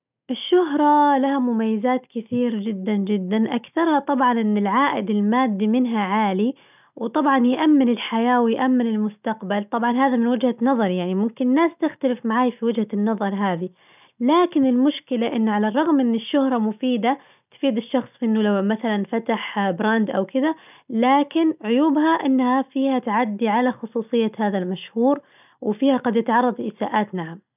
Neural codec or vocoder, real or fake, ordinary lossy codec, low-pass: none; real; none; 3.6 kHz